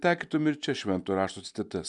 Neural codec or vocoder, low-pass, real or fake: none; 10.8 kHz; real